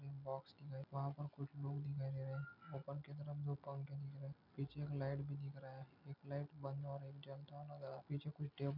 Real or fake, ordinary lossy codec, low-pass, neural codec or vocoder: real; none; 5.4 kHz; none